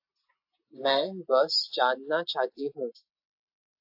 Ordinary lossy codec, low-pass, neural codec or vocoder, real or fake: AAC, 48 kbps; 5.4 kHz; none; real